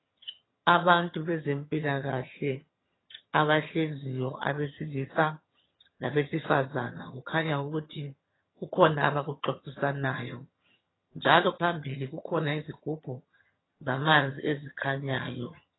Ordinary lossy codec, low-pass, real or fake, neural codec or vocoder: AAC, 16 kbps; 7.2 kHz; fake; vocoder, 22.05 kHz, 80 mel bands, HiFi-GAN